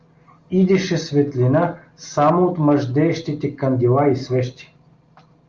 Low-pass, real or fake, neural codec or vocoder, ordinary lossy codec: 7.2 kHz; real; none; Opus, 32 kbps